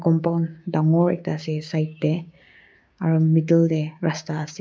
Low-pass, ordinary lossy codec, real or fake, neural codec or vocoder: none; none; fake; codec, 16 kHz, 6 kbps, DAC